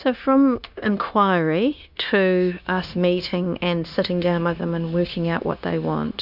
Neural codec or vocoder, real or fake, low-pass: codec, 16 kHz, 0.9 kbps, LongCat-Audio-Codec; fake; 5.4 kHz